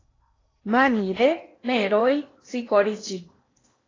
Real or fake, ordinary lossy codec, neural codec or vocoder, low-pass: fake; AAC, 32 kbps; codec, 16 kHz in and 24 kHz out, 0.8 kbps, FocalCodec, streaming, 65536 codes; 7.2 kHz